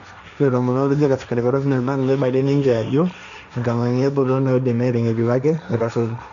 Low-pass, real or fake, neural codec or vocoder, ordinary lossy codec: 7.2 kHz; fake; codec, 16 kHz, 1.1 kbps, Voila-Tokenizer; none